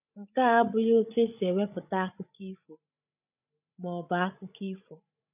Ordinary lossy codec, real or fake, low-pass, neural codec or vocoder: none; fake; 3.6 kHz; codec, 16 kHz, 16 kbps, FreqCodec, larger model